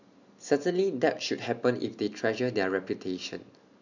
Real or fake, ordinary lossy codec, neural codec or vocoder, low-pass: real; none; none; 7.2 kHz